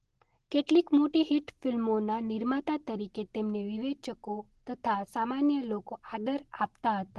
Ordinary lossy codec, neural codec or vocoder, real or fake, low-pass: Opus, 16 kbps; none; real; 10.8 kHz